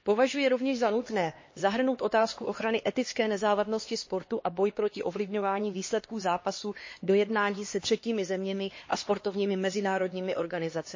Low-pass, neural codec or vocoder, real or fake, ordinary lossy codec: 7.2 kHz; codec, 16 kHz, 2 kbps, X-Codec, HuBERT features, trained on LibriSpeech; fake; MP3, 32 kbps